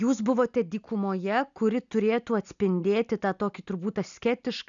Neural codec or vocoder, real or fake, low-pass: none; real; 7.2 kHz